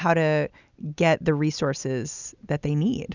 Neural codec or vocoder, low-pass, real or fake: none; 7.2 kHz; real